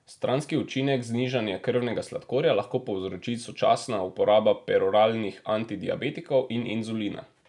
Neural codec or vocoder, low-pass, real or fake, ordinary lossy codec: none; 10.8 kHz; real; none